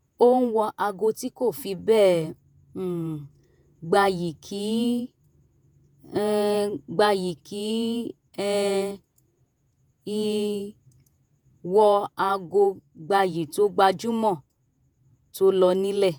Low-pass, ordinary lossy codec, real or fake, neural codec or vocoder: none; none; fake; vocoder, 48 kHz, 128 mel bands, Vocos